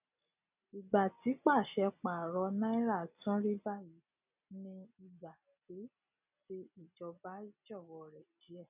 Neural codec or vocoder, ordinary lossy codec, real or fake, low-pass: none; none; real; 3.6 kHz